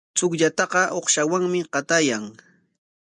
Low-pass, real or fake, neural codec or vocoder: 10.8 kHz; real; none